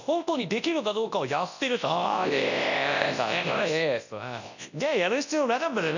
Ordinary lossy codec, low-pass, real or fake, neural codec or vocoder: none; 7.2 kHz; fake; codec, 24 kHz, 0.9 kbps, WavTokenizer, large speech release